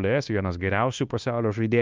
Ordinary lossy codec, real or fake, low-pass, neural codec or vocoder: Opus, 24 kbps; fake; 7.2 kHz; codec, 16 kHz, 0.9 kbps, LongCat-Audio-Codec